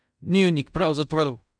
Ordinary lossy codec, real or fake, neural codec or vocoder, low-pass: none; fake; codec, 16 kHz in and 24 kHz out, 0.4 kbps, LongCat-Audio-Codec, fine tuned four codebook decoder; 9.9 kHz